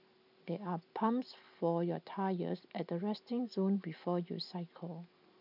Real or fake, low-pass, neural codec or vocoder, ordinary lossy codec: real; 5.4 kHz; none; none